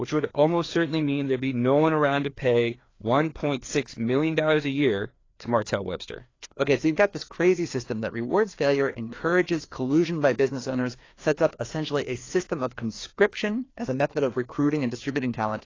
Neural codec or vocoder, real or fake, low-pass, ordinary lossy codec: codec, 16 kHz, 2 kbps, FreqCodec, larger model; fake; 7.2 kHz; AAC, 32 kbps